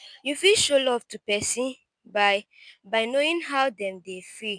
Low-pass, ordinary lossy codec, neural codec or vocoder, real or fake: 9.9 kHz; none; none; real